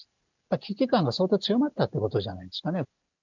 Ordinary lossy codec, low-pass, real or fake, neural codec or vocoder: none; 7.2 kHz; real; none